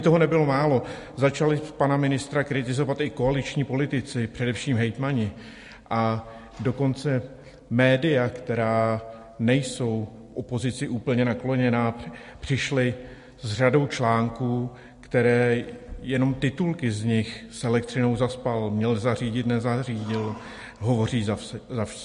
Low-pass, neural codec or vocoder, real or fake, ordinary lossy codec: 10.8 kHz; none; real; MP3, 48 kbps